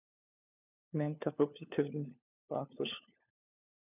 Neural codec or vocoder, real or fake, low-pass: codec, 16 kHz, 4 kbps, FunCodec, trained on LibriTTS, 50 frames a second; fake; 3.6 kHz